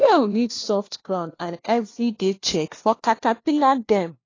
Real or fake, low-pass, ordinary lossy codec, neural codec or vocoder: fake; 7.2 kHz; AAC, 32 kbps; codec, 16 kHz, 1 kbps, FunCodec, trained on LibriTTS, 50 frames a second